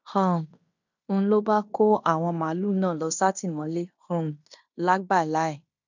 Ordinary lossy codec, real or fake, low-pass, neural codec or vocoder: none; fake; 7.2 kHz; codec, 16 kHz in and 24 kHz out, 0.9 kbps, LongCat-Audio-Codec, fine tuned four codebook decoder